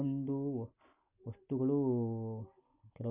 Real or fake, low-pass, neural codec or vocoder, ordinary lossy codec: real; 3.6 kHz; none; none